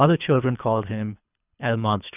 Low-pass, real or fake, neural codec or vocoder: 3.6 kHz; fake; codec, 24 kHz, 3 kbps, HILCodec